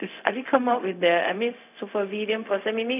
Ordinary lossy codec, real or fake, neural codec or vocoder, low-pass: none; fake; codec, 16 kHz, 0.4 kbps, LongCat-Audio-Codec; 3.6 kHz